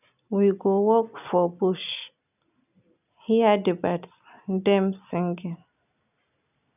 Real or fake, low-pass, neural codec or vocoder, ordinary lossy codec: real; 3.6 kHz; none; none